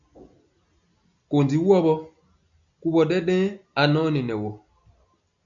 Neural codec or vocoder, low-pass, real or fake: none; 7.2 kHz; real